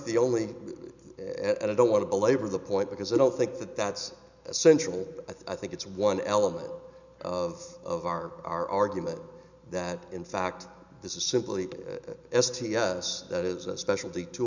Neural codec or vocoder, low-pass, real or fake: none; 7.2 kHz; real